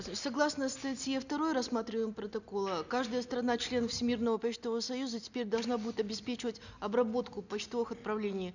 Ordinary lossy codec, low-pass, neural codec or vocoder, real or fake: none; 7.2 kHz; none; real